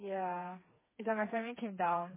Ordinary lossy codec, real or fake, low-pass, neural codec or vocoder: MP3, 16 kbps; fake; 3.6 kHz; codec, 16 kHz, 4 kbps, FreqCodec, smaller model